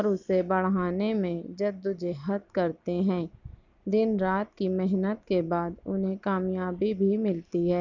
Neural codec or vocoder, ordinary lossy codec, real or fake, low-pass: none; none; real; 7.2 kHz